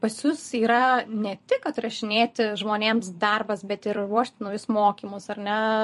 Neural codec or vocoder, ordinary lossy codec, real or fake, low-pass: vocoder, 44.1 kHz, 128 mel bands every 256 samples, BigVGAN v2; MP3, 48 kbps; fake; 14.4 kHz